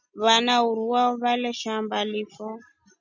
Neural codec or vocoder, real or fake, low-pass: none; real; 7.2 kHz